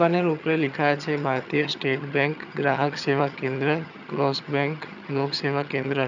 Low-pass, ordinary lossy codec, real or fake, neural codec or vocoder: 7.2 kHz; none; fake; vocoder, 22.05 kHz, 80 mel bands, HiFi-GAN